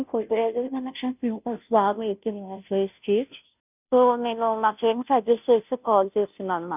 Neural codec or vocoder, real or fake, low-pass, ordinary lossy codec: codec, 16 kHz, 0.5 kbps, FunCodec, trained on Chinese and English, 25 frames a second; fake; 3.6 kHz; none